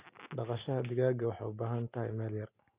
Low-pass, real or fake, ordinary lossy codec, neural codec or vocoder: 3.6 kHz; real; Opus, 64 kbps; none